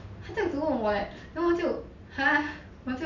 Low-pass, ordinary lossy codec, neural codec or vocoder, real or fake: 7.2 kHz; none; none; real